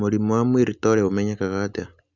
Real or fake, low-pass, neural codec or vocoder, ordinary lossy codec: real; 7.2 kHz; none; Opus, 64 kbps